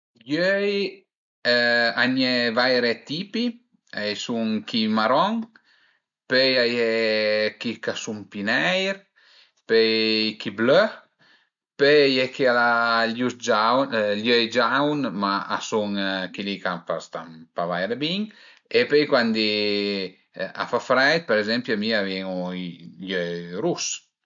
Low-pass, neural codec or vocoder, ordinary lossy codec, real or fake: 7.2 kHz; none; MP3, 64 kbps; real